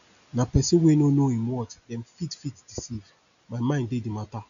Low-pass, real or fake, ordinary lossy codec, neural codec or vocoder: 7.2 kHz; real; none; none